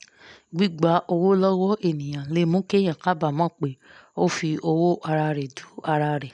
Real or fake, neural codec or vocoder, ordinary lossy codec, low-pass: real; none; none; 10.8 kHz